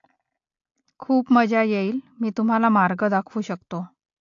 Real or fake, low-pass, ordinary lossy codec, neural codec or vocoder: real; 7.2 kHz; AAC, 48 kbps; none